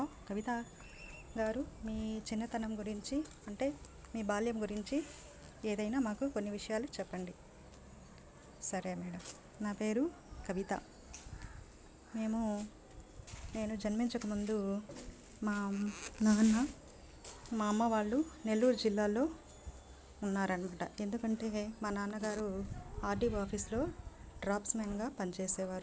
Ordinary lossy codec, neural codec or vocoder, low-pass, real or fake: none; none; none; real